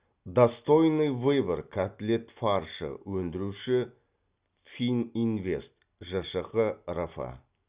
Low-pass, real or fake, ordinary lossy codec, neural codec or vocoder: 3.6 kHz; real; Opus, 64 kbps; none